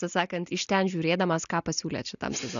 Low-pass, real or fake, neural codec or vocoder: 7.2 kHz; real; none